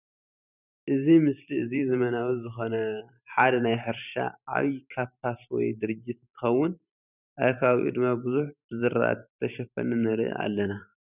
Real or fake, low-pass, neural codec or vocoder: fake; 3.6 kHz; vocoder, 44.1 kHz, 128 mel bands every 512 samples, BigVGAN v2